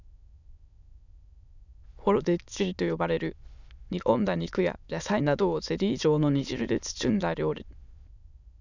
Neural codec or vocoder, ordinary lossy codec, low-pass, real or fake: autoencoder, 22.05 kHz, a latent of 192 numbers a frame, VITS, trained on many speakers; none; 7.2 kHz; fake